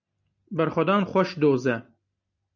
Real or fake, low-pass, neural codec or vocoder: real; 7.2 kHz; none